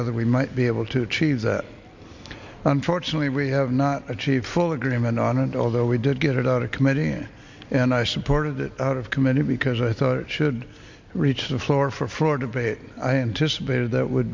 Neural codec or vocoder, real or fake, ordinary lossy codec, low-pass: none; real; MP3, 64 kbps; 7.2 kHz